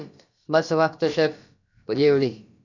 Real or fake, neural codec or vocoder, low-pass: fake; codec, 16 kHz, about 1 kbps, DyCAST, with the encoder's durations; 7.2 kHz